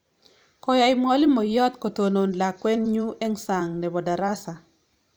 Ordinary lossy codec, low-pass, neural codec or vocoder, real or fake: none; none; vocoder, 44.1 kHz, 128 mel bands every 512 samples, BigVGAN v2; fake